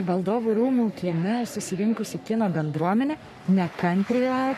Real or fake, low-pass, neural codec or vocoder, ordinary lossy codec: fake; 14.4 kHz; codec, 44.1 kHz, 3.4 kbps, Pupu-Codec; MP3, 96 kbps